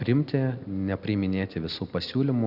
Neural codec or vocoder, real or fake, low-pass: none; real; 5.4 kHz